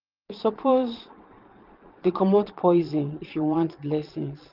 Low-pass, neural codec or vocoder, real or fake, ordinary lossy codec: 5.4 kHz; vocoder, 22.05 kHz, 80 mel bands, Vocos; fake; Opus, 24 kbps